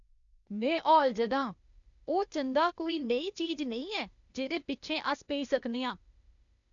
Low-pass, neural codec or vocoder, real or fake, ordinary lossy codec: 7.2 kHz; codec, 16 kHz, 0.8 kbps, ZipCodec; fake; none